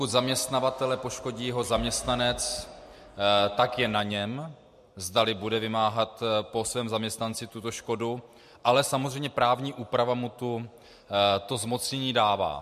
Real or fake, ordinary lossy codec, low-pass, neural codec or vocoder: real; MP3, 64 kbps; 14.4 kHz; none